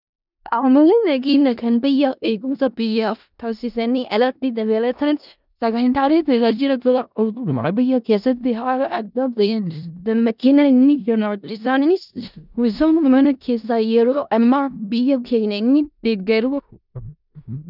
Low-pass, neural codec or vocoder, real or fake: 5.4 kHz; codec, 16 kHz in and 24 kHz out, 0.4 kbps, LongCat-Audio-Codec, four codebook decoder; fake